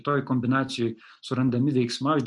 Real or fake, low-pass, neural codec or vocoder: real; 10.8 kHz; none